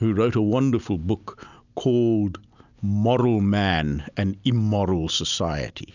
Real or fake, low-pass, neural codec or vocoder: real; 7.2 kHz; none